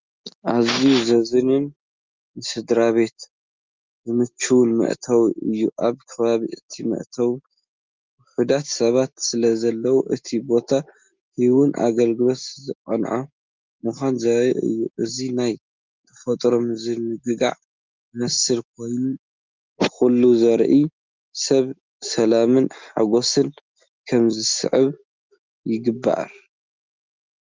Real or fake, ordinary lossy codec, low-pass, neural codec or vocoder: real; Opus, 32 kbps; 7.2 kHz; none